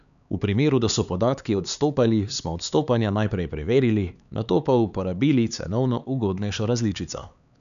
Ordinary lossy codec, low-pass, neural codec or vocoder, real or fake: none; 7.2 kHz; codec, 16 kHz, 4 kbps, X-Codec, HuBERT features, trained on LibriSpeech; fake